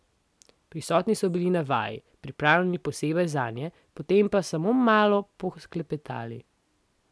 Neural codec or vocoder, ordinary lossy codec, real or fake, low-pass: none; none; real; none